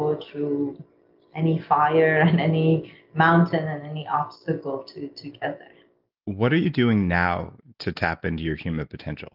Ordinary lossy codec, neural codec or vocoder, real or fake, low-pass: Opus, 32 kbps; none; real; 5.4 kHz